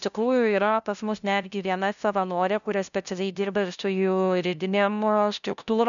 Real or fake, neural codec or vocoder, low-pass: fake; codec, 16 kHz, 0.5 kbps, FunCodec, trained on LibriTTS, 25 frames a second; 7.2 kHz